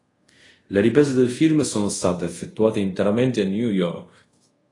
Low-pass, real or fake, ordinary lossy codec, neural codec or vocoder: 10.8 kHz; fake; AAC, 32 kbps; codec, 24 kHz, 0.5 kbps, DualCodec